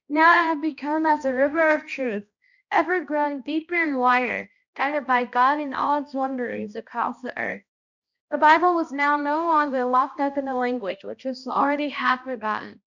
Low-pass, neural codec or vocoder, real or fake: 7.2 kHz; codec, 16 kHz, 1 kbps, X-Codec, HuBERT features, trained on balanced general audio; fake